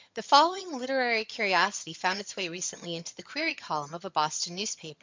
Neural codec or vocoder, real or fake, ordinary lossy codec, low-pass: vocoder, 22.05 kHz, 80 mel bands, HiFi-GAN; fake; MP3, 64 kbps; 7.2 kHz